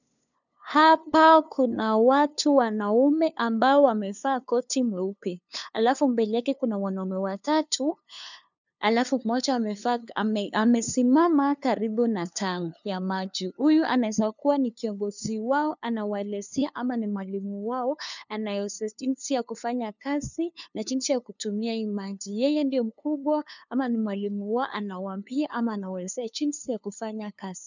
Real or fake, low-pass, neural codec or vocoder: fake; 7.2 kHz; codec, 16 kHz, 2 kbps, FunCodec, trained on LibriTTS, 25 frames a second